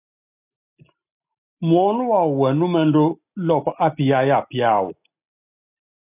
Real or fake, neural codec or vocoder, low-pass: real; none; 3.6 kHz